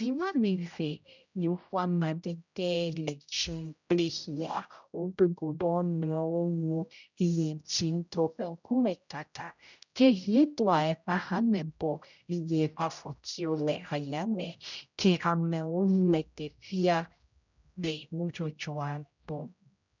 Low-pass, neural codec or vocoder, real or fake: 7.2 kHz; codec, 16 kHz, 0.5 kbps, X-Codec, HuBERT features, trained on general audio; fake